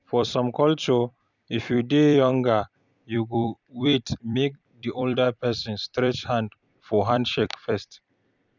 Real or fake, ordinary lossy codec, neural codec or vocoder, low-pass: fake; none; vocoder, 44.1 kHz, 128 mel bands every 256 samples, BigVGAN v2; 7.2 kHz